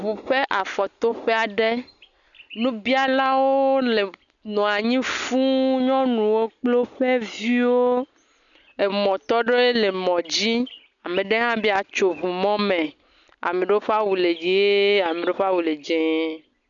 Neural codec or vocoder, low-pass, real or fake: none; 7.2 kHz; real